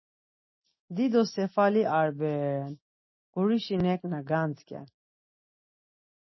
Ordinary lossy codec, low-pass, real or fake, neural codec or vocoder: MP3, 24 kbps; 7.2 kHz; real; none